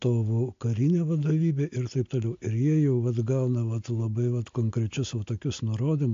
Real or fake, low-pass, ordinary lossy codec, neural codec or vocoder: real; 7.2 kHz; AAC, 48 kbps; none